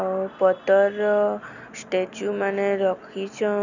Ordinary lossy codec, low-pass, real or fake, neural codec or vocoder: none; 7.2 kHz; real; none